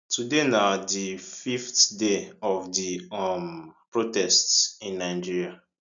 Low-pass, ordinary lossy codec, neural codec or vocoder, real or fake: 7.2 kHz; none; none; real